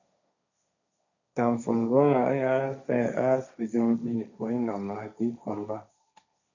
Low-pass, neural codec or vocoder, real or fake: 7.2 kHz; codec, 16 kHz, 1.1 kbps, Voila-Tokenizer; fake